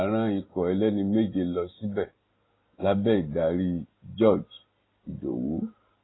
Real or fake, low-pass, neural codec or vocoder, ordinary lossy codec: real; 7.2 kHz; none; AAC, 16 kbps